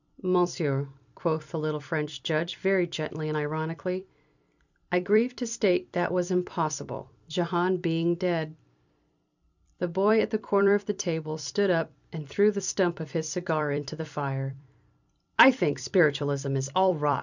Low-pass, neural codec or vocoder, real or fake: 7.2 kHz; vocoder, 44.1 kHz, 128 mel bands every 256 samples, BigVGAN v2; fake